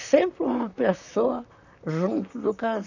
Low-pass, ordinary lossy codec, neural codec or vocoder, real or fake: 7.2 kHz; none; vocoder, 44.1 kHz, 128 mel bands, Pupu-Vocoder; fake